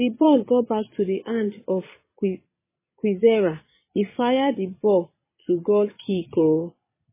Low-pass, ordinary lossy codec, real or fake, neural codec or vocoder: 3.6 kHz; MP3, 16 kbps; fake; vocoder, 22.05 kHz, 80 mel bands, Vocos